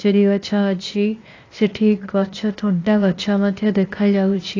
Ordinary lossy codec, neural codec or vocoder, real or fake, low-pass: AAC, 32 kbps; codec, 16 kHz, 0.8 kbps, ZipCodec; fake; 7.2 kHz